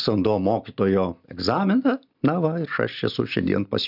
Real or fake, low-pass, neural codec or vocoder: real; 5.4 kHz; none